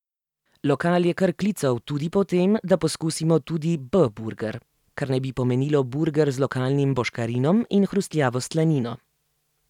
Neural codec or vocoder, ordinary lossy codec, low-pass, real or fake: none; none; 19.8 kHz; real